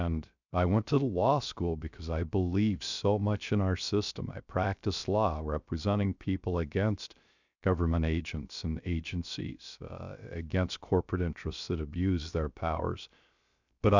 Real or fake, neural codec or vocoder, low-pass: fake; codec, 16 kHz, 0.3 kbps, FocalCodec; 7.2 kHz